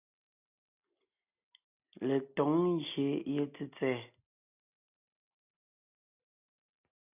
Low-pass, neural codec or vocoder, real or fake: 3.6 kHz; none; real